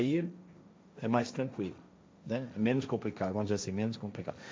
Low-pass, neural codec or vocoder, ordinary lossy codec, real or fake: none; codec, 16 kHz, 1.1 kbps, Voila-Tokenizer; none; fake